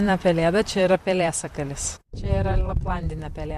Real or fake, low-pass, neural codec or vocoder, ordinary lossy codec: fake; 14.4 kHz; vocoder, 44.1 kHz, 128 mel bands, Pupu-Vocoder; MP3, 64 kbps